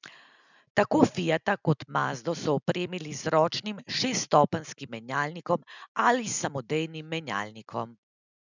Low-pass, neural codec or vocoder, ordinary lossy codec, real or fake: 7.2 kHz; none; none; real